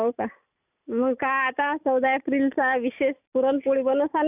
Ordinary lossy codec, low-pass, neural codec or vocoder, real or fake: none; 3.6 kHz; none; real